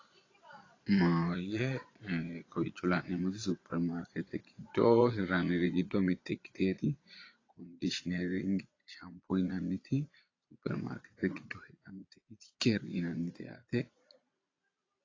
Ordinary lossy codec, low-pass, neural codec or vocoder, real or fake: AAC, 32 kbps; 7.2 kHz; vocoder, 22.05 kHz, 80 mel bands, Vocos; fake